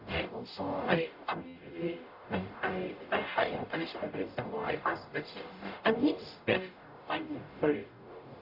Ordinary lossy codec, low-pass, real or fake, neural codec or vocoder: none; 5.4 kHz; fake; codec, 44.1 kHz, 0.9 kbps, DAC